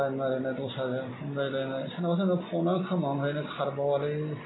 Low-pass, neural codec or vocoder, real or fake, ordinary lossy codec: 7.2 kHz; none; real; AAC, 16 kbps